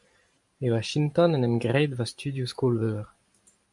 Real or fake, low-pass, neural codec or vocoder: fake; 10.8 kHz; vocoder, 44.1 kHz, 128 mel bands every 512 samples, BigVGAN v2